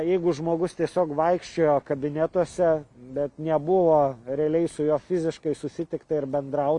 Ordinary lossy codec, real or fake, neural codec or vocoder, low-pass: MP3, 64 kbps; real; none; 10.8 kHz